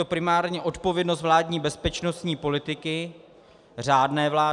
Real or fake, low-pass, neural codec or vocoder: real; 10.8 kHz; none